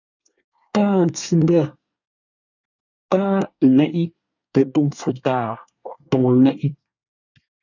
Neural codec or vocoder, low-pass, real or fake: codec, 24 kHz, 1 kbps, SNAC; 7.2 kHz; fake